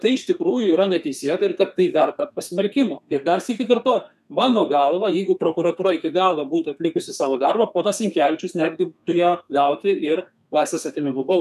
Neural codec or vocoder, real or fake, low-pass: codec, 32 kHz, 1.9 kbps, SNAC; fake; 14.4 kHz